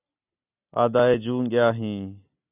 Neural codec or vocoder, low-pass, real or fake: none; 3.6 kHz; real